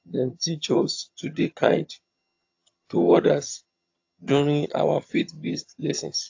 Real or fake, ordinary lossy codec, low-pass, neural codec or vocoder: fake; AAC, 48 kbps; 7.2 kHz; vocoder, 22.05 kHz, 80 mel bands, HiFi-GAN